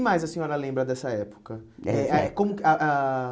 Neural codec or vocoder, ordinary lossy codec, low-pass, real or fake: none; none; none; real